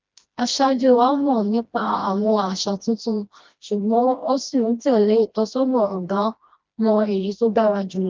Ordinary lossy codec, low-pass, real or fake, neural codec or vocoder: Opus, 24 kbps; 7.2 kHz; fake; codec, 16 kHz, 1 kbps, FreqCodec, smaller model